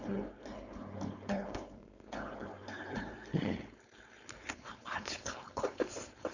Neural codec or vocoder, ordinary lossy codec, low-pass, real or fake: codec, 16 kHz, 4.8 kbps, FACodec; none; 7.2 kHz; fake